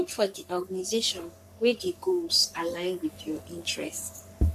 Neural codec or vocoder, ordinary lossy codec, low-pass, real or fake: codec, 44.1 kHz, 3.4 kbps, Pupu-Codec; MP3, 96 kbps; 14.4 kHz; fake